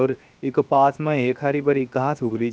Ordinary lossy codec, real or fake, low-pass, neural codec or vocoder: none; fake; none; codec, 16 kHz, 0.7 kbps, FocalCodec